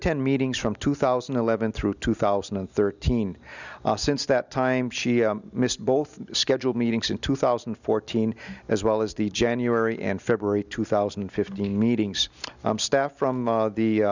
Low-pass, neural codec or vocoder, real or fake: 7.2 kHz; none; real